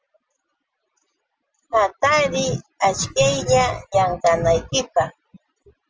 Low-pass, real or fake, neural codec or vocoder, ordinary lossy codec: 7.2 kHz; real; none; Opus, 24 kbps